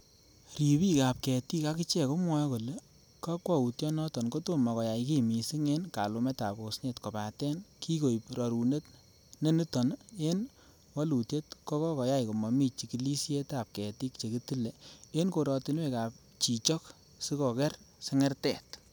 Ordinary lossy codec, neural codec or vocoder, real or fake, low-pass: none; none; real; none